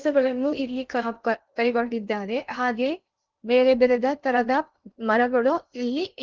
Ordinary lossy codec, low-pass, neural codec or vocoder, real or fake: Opus, 32 kbps; 7.2 kHz; codec, 16 kHz in and 24 kHz out, 0.6 kbps, FocalCodec, streaming, 2048 codes; fake